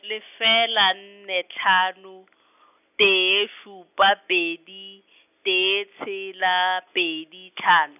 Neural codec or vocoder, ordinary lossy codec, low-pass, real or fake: none; none; 3.6 kHz; real